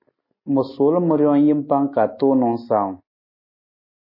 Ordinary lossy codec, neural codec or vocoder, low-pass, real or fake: MP3, 24 kbps; none; 5.4 kHz; real